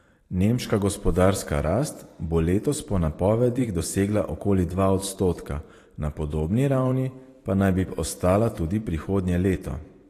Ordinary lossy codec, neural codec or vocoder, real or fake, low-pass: AAC, 48 kbps; vocoder, 44.1 kHz, 128 mel bands every 512 samples, BigVGAN v2; fake; 14.4 kHz